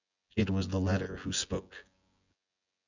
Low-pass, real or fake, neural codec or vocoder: 7.2 kHz; fake; vocoder, 24 kHz, 100 mel bands, Vocos